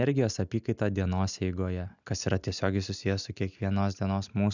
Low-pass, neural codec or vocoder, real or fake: 7.2 kHz; none; real